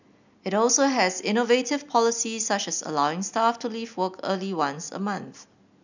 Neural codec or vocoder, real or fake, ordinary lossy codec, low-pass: none; real; none; 7.2 kHz